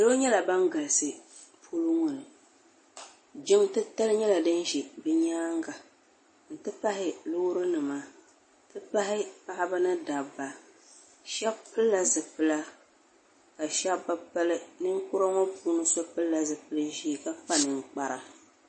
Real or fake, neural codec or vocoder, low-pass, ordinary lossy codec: real; none; 10.8 kHz; MP3, 32 kbps